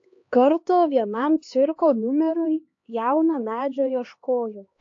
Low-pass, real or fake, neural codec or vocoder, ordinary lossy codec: 7.2 kHz; fake; codec, 16 kHz, 2 kbps, X-Codec, HuBERT features, trained on LibriSpeech; AAC, 48 kbps